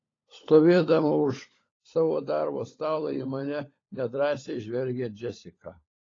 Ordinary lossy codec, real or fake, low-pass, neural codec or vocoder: AAC, 32 kbps; fake; 7.2 kHz; codec, 16 kHz, 16 kbps, FunCodec, trained on LibriTTS, 50 frames a second